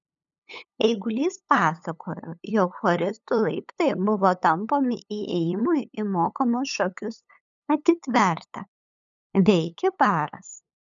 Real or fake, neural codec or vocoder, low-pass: fake; codec, 16 kHz, 8 kbps, FunCodec, trained on LibriTTS, 25 frames a second; 7.2 kHz